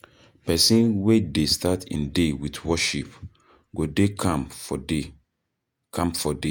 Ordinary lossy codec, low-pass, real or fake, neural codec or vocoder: none; none; fake; vocoder, 48 kHz, 128 mel bands, Vocos